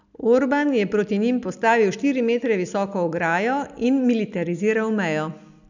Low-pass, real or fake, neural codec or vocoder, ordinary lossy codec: 7.2 kHz; real; none; none